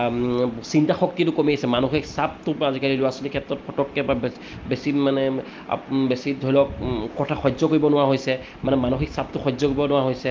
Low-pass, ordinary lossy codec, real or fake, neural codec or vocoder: 7.2 kHz; Opus, 32 kbps; real; none